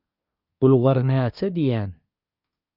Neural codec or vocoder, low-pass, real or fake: codec, 24 kHz, 1 kbps, SNAC; 5.4 kHz; fake